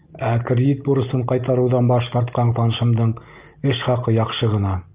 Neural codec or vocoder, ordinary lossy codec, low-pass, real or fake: none; Opus, 32 kbps; 3.6 kHz; real